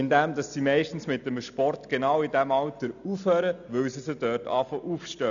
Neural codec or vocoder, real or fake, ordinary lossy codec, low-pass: none; real; none; 7.2 kHz